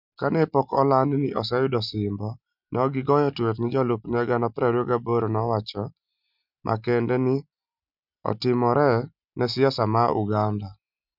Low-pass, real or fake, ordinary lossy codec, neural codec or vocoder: 5.4 kHz; real; none; none